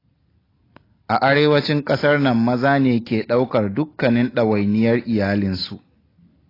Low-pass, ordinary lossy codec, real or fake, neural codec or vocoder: 5.4 kHz; AAC, 24 kbps; real; none